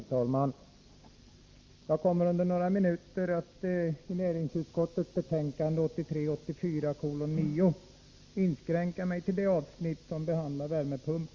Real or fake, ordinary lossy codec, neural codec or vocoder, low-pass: real; Opus, 32 kbps; none; 7.2 kHz